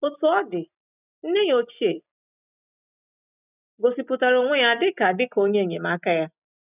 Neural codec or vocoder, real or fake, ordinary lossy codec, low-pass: none; real; none; 3.6 kHz